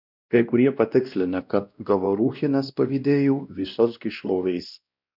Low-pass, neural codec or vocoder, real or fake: 5.4 kHz; codec, 16 kHz, 1 kbps, X-Codec, WavLM features, trained on Multilingual LibriSpeech; fake